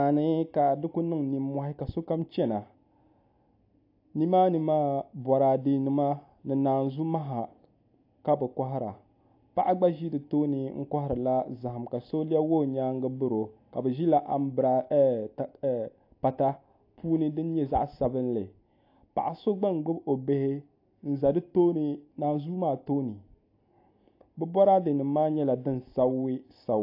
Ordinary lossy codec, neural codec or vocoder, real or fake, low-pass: AAC, 32 kbps; none; real; 5.4 kHz